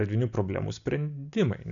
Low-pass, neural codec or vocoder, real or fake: 7.2 kHz; none; real